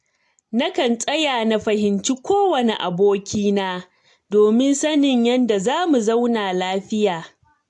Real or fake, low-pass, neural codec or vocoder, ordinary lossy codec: real; 10.8 kHz; none; AAC, 64 kbps